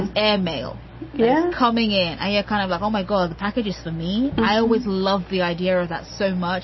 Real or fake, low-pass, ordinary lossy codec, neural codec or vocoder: real; 7.2 kHz; MP3, 24 kbps; none